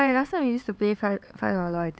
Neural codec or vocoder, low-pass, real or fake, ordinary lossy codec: codec, 16 kHz, 4 kbps, X-Codec, HuBERT features, trained on LibriSpeech; none; fake; none